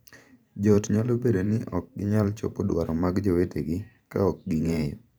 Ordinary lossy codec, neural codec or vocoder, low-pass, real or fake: none; vocoder, 44.1 kHz, 128 mel bands every 512 samples, BigVGAN v2; none; fake